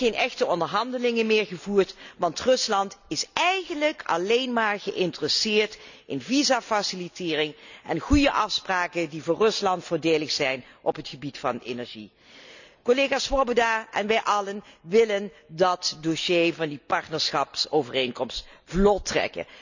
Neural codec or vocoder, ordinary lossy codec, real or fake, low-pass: none; none; real; 7.2 kHz